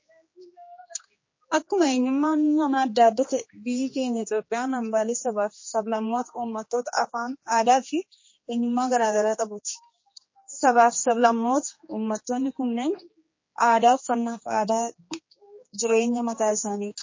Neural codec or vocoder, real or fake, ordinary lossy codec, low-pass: codec, 16 kHz, 2 kbps, X-Codec, HuBERT features, trained on general audio; fake; MP3, 32 kbps; 7.2 kHz